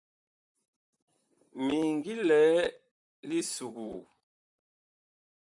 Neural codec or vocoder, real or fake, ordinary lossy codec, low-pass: vocoder, 44.1 kHz, 128 mel bands, Pupu-Vocoder; fake; MP3, 96 kbps; 10.8 kHz